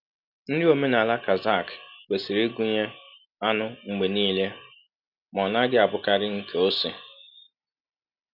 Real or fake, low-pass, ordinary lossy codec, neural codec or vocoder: real; 5.4 kHz; none; none